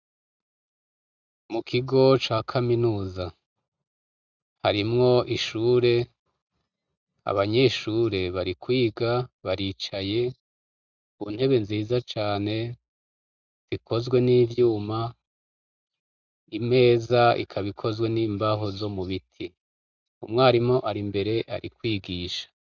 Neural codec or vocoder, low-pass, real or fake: none; 7.2 kHz; real